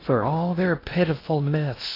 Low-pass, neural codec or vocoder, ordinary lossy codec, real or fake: 5.4 kHz; codec, 16 kHz in and 24 kHz out, 0.6 kbps, FocalCodec, streaming, 2048 codes; AAC, 24 kbps; fake